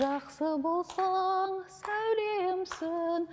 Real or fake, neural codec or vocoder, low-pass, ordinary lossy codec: real; none; none; none